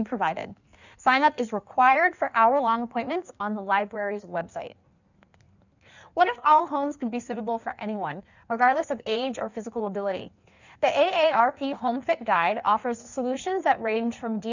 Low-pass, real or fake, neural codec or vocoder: 7.2 kHz; fake; codec, 16 kHz in and 24 kHz out, 1.1 kbps, FireRedTTS-2 codec